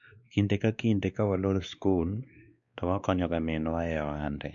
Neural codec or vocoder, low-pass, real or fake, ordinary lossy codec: codec, 16 kHz, 2 kbps, X-Codec, WavLM features, trained on Multilingual LibriSpeech; 7.2 kHz; fake; none